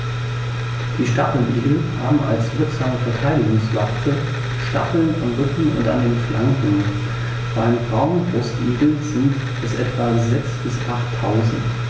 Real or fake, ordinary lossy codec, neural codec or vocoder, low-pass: real; none; none; none